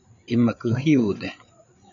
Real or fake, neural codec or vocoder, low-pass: fake; codec, 16 kHz, 8 kbps, FreqCodec, larger model; 7.2 kHz